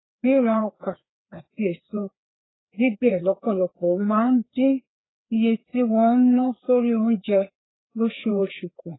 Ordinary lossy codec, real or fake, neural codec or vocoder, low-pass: AAC, 16 kbps; fake; codec, 16 kHz, 2 kbps, FreqCodec, larger model; 7.2 kHz